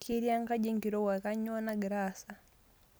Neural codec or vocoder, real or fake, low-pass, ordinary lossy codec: none; real; none; none